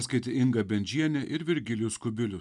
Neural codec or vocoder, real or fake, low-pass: none; real; 10.8 kHz